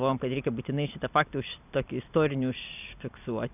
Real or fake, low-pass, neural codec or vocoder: real; 3.6 kHz; none